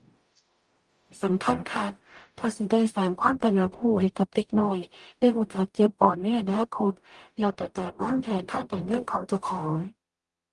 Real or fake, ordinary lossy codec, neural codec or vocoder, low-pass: fake; Opus, 32 kbps; codec, 44.1 kHz, 0.9 kbps, DAC; 10.8 kHz